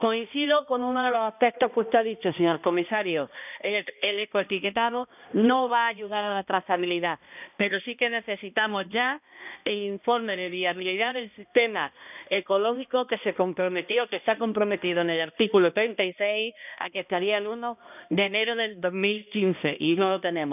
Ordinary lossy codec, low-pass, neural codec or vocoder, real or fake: none; 3.6 kHz; codec, 16 kHz, 1 kbps, X-Codec, HuBERT features, trained on balanced general audio; fake